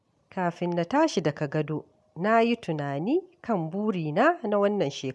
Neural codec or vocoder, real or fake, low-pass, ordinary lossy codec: none; real; none; none